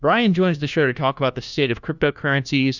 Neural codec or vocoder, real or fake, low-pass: codec, 16 kHz, 1 kbps, FunCodec, trained on LibriTTS, 50 frames a second; fake; 7.2 kHz